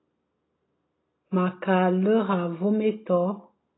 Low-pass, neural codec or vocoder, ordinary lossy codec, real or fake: 7.2 kHz; none; AAC, 16 kbps; real